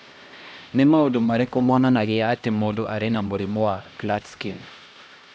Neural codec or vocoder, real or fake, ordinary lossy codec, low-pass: codec, 16 kHz, 1 kbps, X-Codec, HuBERT features, trained on LibriSpeech; fake; none; none